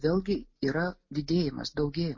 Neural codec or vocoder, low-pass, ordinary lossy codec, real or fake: none; 7.2 kHz; MP3, 32 kbps; real